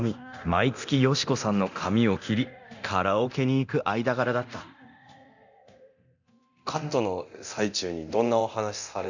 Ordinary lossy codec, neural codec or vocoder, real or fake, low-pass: none; codec, 24 kHz, 0.9 kbps, DualCodec; fake; 7.2 kHz